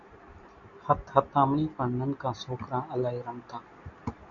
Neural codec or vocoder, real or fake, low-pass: none; real; 7.2 kHz